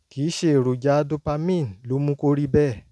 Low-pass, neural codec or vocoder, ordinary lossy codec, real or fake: none; none; none; real